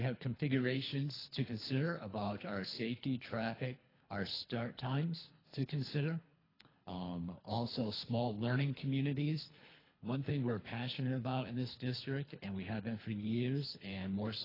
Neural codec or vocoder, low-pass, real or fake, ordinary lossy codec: codec, 24 kHz, 3 kbps, HILCodec; 5.4 kHz; fake; AAC, 24 kbps